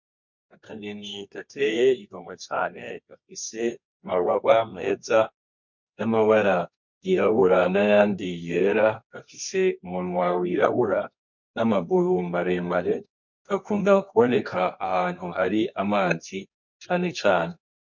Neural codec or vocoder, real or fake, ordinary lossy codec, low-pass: codec, 24 kHz, 0.9 kbps, WavTokenizer, medium music audio release; fake; MP3, 48 kbps; 7.2 kHz